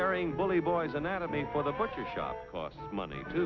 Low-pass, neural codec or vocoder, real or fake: 7.2 kHz; none; real